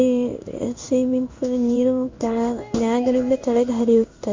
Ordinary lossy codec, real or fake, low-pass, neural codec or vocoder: MP3, 48 kbps; fake; 7.2 kHz; codec, 16 kHz in and 24 kHz out, 1 kbps, XY-Tokenizer